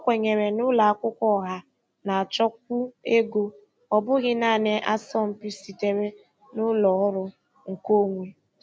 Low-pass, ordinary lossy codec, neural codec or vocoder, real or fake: none; none; none; real